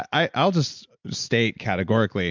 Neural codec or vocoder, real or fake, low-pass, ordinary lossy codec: none; real; 7.2 kHz; MP3, 64 kbps